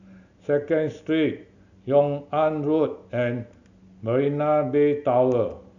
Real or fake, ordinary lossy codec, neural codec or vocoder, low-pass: real; none; none; 7.2 kHz